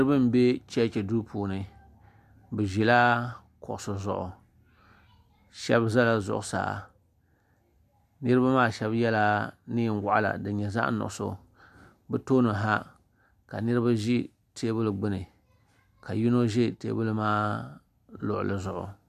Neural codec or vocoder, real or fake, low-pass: none; real; 14.4 kHz